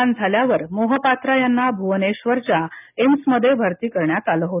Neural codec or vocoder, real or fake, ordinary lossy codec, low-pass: none; real; none; 3.6 kHz